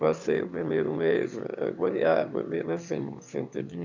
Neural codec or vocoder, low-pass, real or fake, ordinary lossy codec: autoencoder, 22.05 kHz, a latent of 192 numbers a frame, VITS, trained on one speaker; 7.2 kHz; fake; none